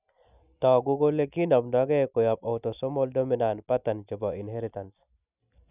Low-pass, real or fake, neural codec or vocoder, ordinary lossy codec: 3.6 kHz; real; none; none